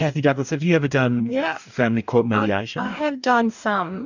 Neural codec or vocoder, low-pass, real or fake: codec, 44.1 kHz, 2.6 kbps, DAC; 7.2 kHz; fake